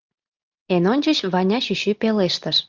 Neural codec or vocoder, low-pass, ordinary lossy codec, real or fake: none; 7.2 kHz; Opus, 32 kbps; real